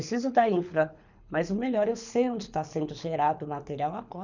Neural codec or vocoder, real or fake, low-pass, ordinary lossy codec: codec, 24 kHz, 6 kbps, HILCodec; fake; 7.2 kHz; none